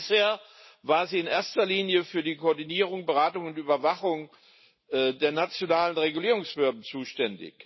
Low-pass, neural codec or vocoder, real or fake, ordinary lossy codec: 7.2 kHz; none; real; MP3, 24 kbps